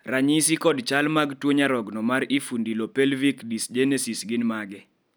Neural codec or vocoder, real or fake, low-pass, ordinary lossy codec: none; real; none; none